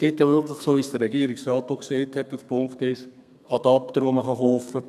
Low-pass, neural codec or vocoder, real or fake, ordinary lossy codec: 14.4 kHz; codec, 32 kHz, 1.9 kbps, SNAC; fake; none